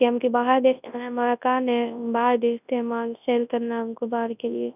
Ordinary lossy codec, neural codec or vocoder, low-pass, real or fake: none; codec, 24 kHz, 0.9 kbps, WavTokenizer, large speech release; 3.6 kHz; fake